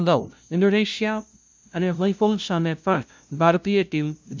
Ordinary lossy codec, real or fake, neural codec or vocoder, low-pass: none; fake; codec, 16 kHz, 0.5 kbps, FunCodec, trained on LibriTTS, 25 frames a second; none